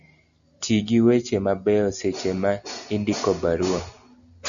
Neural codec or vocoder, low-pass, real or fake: none; 7.2 kHz; real